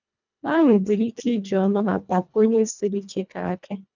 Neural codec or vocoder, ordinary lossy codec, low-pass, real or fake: codec, 24 kHz, 1.5 kbps, HILCodec; none; 7.2 kHz; fake